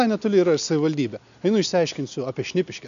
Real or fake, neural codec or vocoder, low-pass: real; none; 7.2 kHz